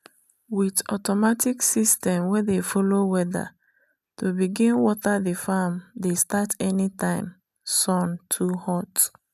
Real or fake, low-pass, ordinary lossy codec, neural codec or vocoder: real; 14.4 kHz; none; none